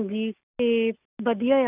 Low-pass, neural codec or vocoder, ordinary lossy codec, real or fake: 3.6 kHz; none; none; real